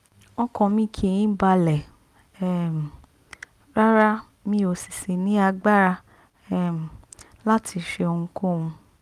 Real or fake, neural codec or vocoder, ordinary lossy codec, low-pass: real; none; Opus, 32 kbps; 14.4 kHz